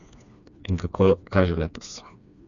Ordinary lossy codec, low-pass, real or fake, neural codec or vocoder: none; 7.2 kHz; fake; codec, 16 kHz, 2 kbps, FreqCodec, smaller model